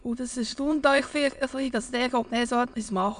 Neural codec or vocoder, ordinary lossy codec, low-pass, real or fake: autoencoder, 22.05 kHz, a latent of 192 numbers a frame, VITS, trained on many speakers; none; 9.9 kHz; fake